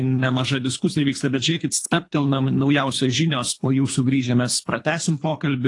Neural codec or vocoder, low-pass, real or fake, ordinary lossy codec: codec, 24 kHz, 3 kbps, HILCodec; 10.8 kHz; fake; AAC, 48 kbps